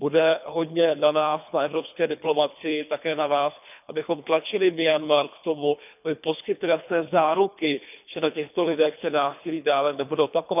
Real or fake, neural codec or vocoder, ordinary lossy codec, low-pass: fake; codec, 24 kHz, 3 kbps, HILCodec; none; 3.6 kHz